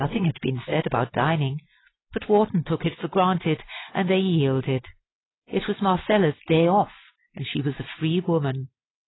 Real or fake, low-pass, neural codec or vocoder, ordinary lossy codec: real; 7.2 kHz; none; AAC, 16 kbps